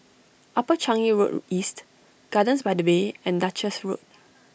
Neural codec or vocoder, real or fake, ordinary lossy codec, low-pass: none; real; none; none